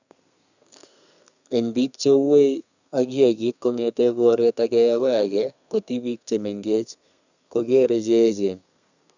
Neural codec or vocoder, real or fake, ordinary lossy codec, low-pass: codec, 32 kHz, 1.9 kbps, SNAC; fake; none; 7.2 kHz